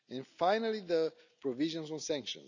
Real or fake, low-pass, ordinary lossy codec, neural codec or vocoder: real; 7.2 kHz; none; none